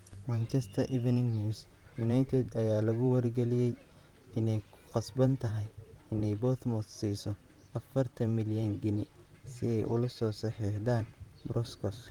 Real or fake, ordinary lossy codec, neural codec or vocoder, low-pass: fake; Opus, 32 kbps; vocoder, 44.1 kHz, 128 mel bands, Pupu-Vocoder; 19.8 kHz